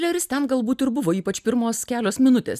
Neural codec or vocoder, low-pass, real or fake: none; 14.4 kHz; real